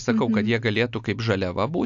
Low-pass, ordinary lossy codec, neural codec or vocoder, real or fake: 7.2 kHz; AAC, 64 kbps; none; real